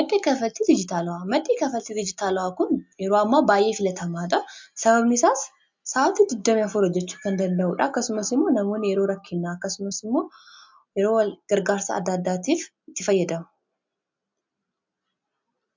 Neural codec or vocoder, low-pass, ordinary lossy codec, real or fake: none; 7.2 kHz; MP3, 64 kbps; real